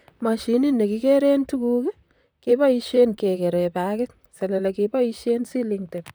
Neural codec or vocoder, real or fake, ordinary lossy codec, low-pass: vocoder, 44.1 kHz, 128 mel bands, Pupu-Vocoder; fake; none; none